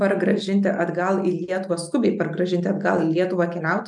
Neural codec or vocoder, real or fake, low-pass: none; real; 10.8 kHz